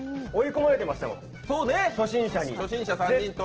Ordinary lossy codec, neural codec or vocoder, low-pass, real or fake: Opus, 16 kbps; none; 7.2 kHz; real